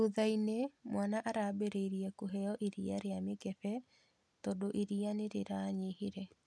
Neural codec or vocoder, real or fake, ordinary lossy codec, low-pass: none; real; none; none